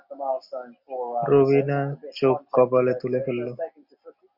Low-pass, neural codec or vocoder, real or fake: 5.4 kHz; none; real